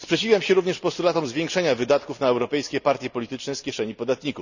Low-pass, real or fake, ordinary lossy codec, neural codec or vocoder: 7.2 kHz; real; none; none